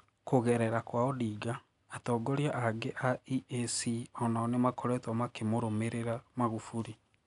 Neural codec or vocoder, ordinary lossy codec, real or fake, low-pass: none; none; real; 10.8 kHz